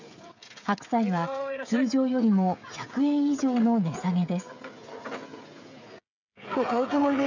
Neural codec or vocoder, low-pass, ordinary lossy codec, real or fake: codec, 16 kHz, 16 kbps, FreqCodec, smaller model; 7.2 kHz; none; fake